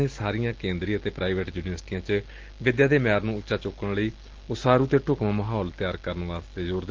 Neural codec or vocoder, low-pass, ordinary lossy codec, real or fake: none; 7.2 kHz; Opus, 16 kbps; real